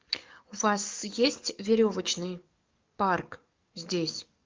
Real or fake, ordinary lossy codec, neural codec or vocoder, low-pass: fake; Opus, 32 kbps; codec, 44.1 kHz, 7.8 kbps, DAC; 7.2 kHz